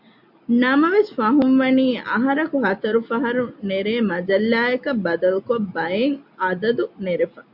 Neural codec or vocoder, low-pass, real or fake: none; 5.4 kHz; real